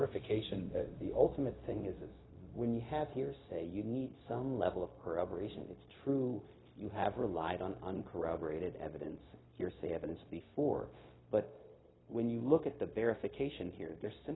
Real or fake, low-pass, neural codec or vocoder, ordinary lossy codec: fake; 7.2 kHz; codec, 16 kHz, 0.4 kbps, LongCat-Audio-Codec; AAC, 16 kbps